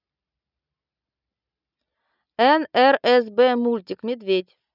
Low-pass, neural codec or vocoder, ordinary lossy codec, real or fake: 5.4 kHz; none; none; real